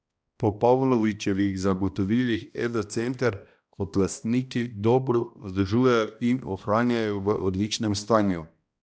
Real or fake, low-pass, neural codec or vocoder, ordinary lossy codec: fake; none; codec, 16 kHz, 1 kbps, X-Codec, HuBERT features, trained on balanced general audio; none